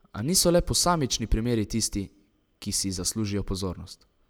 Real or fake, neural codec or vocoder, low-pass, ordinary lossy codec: real; none; none; none